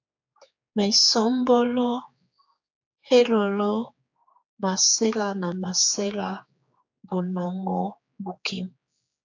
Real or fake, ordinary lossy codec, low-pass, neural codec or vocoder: fake; AAC, 48 kbps; 7.2 kHz; codec, 16 kHz, 4 kbps, X-Codec, HuBERT features, trained on general audio